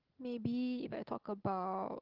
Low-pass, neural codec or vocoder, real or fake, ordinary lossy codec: 5.4 kHz; none; real; Opus, 16 kbps